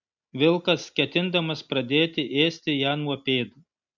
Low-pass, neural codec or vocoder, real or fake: 7.2 kHz; none; real